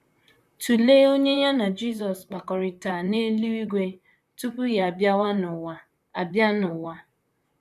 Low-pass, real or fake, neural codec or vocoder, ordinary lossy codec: 14.4 kHz; fake; vocoder, 44.1 kHz, 128 mel bands, Pupu-Vocoder; none